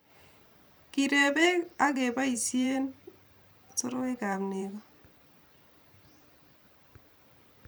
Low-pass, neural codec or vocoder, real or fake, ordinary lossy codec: none; vocoder, 44.1 kHz, 128 mel bands every 512 samples, BigVGAN v2; fake; none